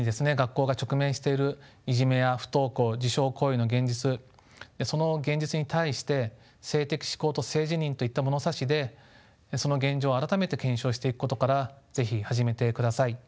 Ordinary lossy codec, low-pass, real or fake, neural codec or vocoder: none; none; real; none